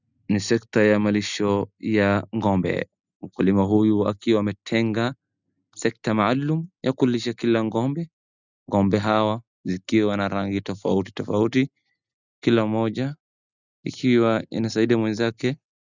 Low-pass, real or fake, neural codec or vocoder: 7.2 kHz; real; none